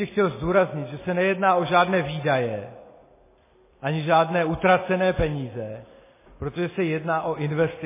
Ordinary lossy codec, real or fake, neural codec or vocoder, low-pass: MP3, 16 kbps; real; none; 3.6 kHz